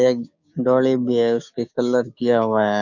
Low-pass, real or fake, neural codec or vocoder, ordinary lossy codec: 7.2 kHz; fake; codec, 16 kHz, 6 kbps, DAC; none